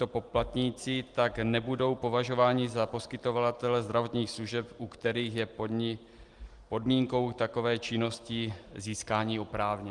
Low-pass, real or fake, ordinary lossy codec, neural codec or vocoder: 10.8 kHz; real; Opus, 24 kbps; none